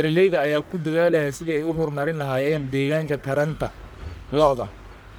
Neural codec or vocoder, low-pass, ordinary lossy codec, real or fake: codec, 44.1 kHz, 1.7 kbps, Pupu-Codec; none; none; fake